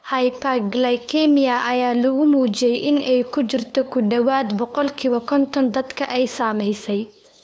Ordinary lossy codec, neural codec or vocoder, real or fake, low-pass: none; codec, 16 kHz, 2 kbps, FunCodec, trained on LibriTTS, 25 frames a second; fake; none